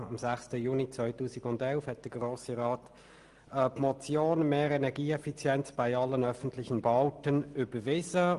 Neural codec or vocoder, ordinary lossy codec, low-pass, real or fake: none; Opus, 24 kbps; 10.8 kHz; real